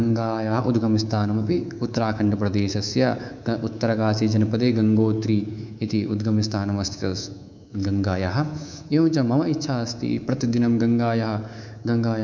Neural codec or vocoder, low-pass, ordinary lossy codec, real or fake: codec, 24 kHz, 3.1 kbps, DualCodec; 7.2 kHz; none; fake